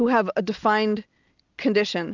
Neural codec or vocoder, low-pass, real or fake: none; 7.2 kHz; real